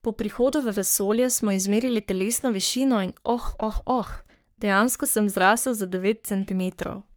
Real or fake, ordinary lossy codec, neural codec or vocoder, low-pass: fake; none; codec, 44.1 kHz, 3.4 kbps, Pupu-Codec; none